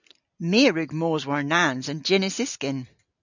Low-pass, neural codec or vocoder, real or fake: 7.2 kHz; none; real